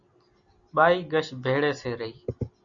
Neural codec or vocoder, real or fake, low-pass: none; real; 7.2 kHz